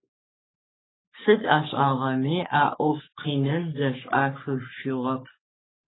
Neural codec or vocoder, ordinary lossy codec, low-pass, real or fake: codec, 44.1 kHz, 3.4 kbps, Pupu-Codec; AAC, 16 kbps; 7.2 kHz; fake